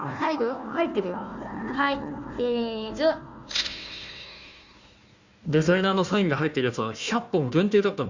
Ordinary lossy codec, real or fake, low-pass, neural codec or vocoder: Opus, 64 kbps; fake; 7.2 kHz; codec, 16 kHz, 1 kbps, FunCodec, trained on Chinese and English, 50 frames a second